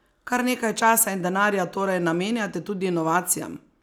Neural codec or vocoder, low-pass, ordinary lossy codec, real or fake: none; 19.8 kHz; none; real